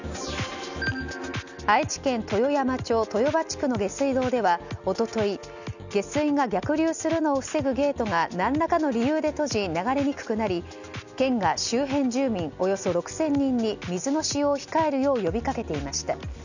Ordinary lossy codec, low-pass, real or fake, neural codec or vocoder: none; 7.2 kHz; real; none